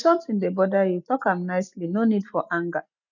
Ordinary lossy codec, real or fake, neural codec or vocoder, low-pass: none; real; none; 7.2 kHz